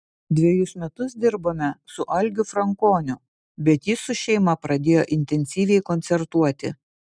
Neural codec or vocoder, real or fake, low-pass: none; real; 9.9 kHz